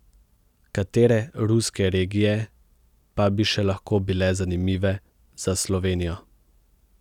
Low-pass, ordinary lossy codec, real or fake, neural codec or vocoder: 19.8 kHz; none; real; none